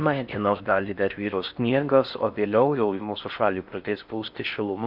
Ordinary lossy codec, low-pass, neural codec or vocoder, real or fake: Opus, 64 kbps; 5.4 kHz; codec, 16 kHz in and 24 kHz out, 0.6 kbps, FocalCodec, streaming, 4096 codes; fake